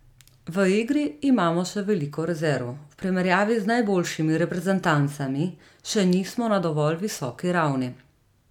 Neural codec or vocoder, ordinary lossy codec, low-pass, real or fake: none; none; 19.8 kHz; real